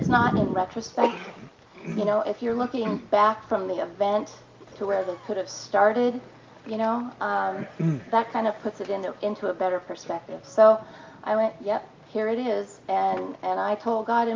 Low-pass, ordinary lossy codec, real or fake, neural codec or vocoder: 7.2 kHz; Opus, 32 kbps; real; none